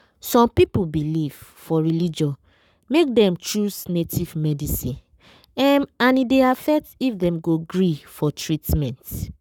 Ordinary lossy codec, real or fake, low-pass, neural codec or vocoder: none; fake; 19.8 kHz; codec, 44.1 kHz, 7.8 kbps, Pupu-Codec